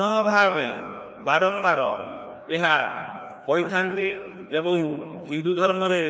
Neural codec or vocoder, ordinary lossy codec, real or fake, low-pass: codec, 16 kHz, 1 kbps, FreqCodec, larger model; none; fake; none